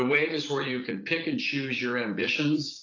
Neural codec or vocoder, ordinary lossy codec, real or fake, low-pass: vocoder, 44.1 kHz, 80 mel bands, Vocos; AAC, 32 kbps; fake; 7.2 kHz